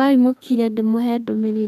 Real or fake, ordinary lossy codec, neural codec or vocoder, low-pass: fake; none; codec, 32 kHz, 1.9 kbps, SNAC; 14.4 kHz